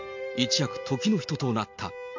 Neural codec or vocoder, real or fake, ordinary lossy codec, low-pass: none; real; MP3, 48 kbps; 7.2 kHz